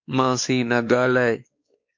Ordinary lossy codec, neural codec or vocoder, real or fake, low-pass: MP3, 48 kbps; codec, 16 kHz, 2 kbps, X-Codec, WavLM features, trained on Multilingual LibriSpeech; fake; 7.2 kHz